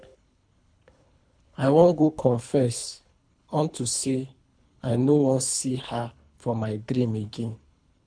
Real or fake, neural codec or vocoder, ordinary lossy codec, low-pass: fake; codec, 24 kHz, 3 kbps, HILCodec; none; 9.9 kHz